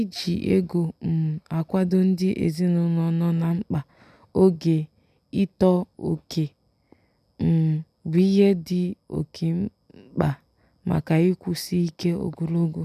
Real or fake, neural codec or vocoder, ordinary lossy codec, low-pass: real; none; none; 14.4 kHz